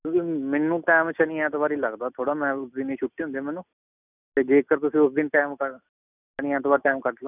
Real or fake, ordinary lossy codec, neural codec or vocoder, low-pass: real; none; none; 3.6 kHz